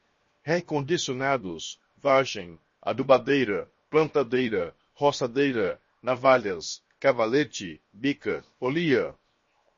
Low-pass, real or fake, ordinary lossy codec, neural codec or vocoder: 7.2 kHz; fake; MP3, 32 kbps; codec, 16 kHz, 0.7 kbps, FocalCodec